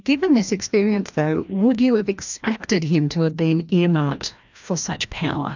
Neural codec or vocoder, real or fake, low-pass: codec, 16 kHz, 1 kbps, FreqCodec, larger model; fake; 7.2 kHz